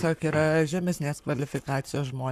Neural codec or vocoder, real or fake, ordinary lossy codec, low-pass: codec, 44.1 kHz, 7.8 kbps, Pupu-Codec; fake; MP3, 96 kbps; 14.4 kHz